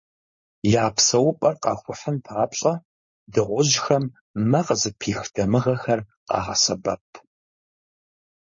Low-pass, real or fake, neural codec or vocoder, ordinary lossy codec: 7.2 kHz; fake; codec, 16 kHz, 4.8 kbps, FACodec; MP3, 32 kbps